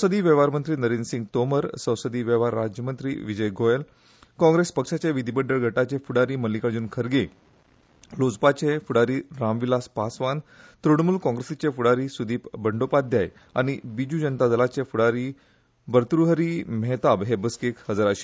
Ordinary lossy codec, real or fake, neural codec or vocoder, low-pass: none; real; none; none